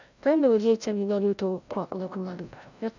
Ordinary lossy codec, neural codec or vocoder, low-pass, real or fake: none; codec, 16 kHz, 0.5 kbps, FreqCodec, larger model; 7.2 kHz; fake